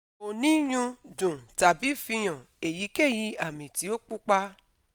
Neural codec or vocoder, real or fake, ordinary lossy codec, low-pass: none; real; none; none